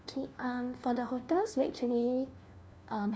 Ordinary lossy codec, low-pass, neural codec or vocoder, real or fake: none; none; codec, 16 kHz, 1 kbps, FunCodec, trained on LibriTTS, 50 frames a second; fake